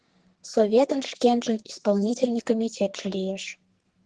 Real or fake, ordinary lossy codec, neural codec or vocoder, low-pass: fake; Opus, 16 kbps; codec, 44.1 kHz, 2.6 kbps, SNAC; 10.8 kHz